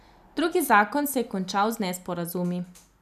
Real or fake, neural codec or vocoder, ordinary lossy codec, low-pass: real; none; none; 14.4 kHz